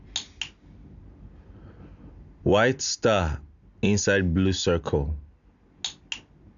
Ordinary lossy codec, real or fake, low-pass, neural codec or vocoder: none; real; 7.2 kHz; none